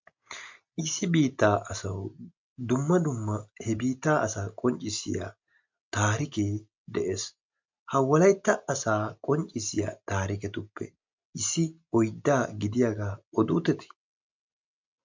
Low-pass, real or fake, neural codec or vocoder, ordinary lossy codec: 7.2 kHz; real; none; MP3, 64 kbps